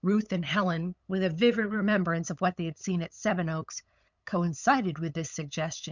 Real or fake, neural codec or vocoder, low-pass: fake; codec, 16 kHz, 8 kbps, FunCodec, trained on LibriTTS, 25 frames a second; 7.2 kHz